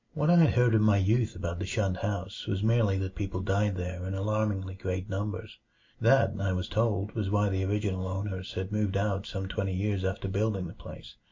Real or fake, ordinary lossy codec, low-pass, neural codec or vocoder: real; MP3, 48 kbps; 7.2 kHz; none